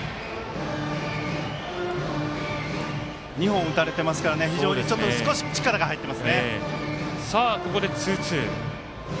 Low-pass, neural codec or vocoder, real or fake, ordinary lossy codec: none; none; real; none